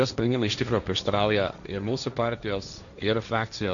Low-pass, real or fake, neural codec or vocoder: 7.2 kHz; fake; codec, 16 kHz, 1.1 kbps, Voila-Tokenizer